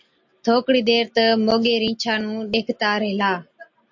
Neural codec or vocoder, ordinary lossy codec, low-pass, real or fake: none; MP3, 48 kbps; 7.2 kHz; real